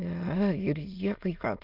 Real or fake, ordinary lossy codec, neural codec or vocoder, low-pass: fake; Opus, 24 kbps; autoencoder, 22.05 kHz, a latent of 192 numbers a frame, VITS, trained on many speakers; 5.4 kHz